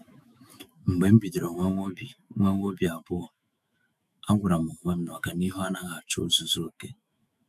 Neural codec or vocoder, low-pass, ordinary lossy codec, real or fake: autoencoder, 48 kHz, 128 numbers a frame, DAC-VAE, trained on Japanese speech; 14.4 kHz; none; fake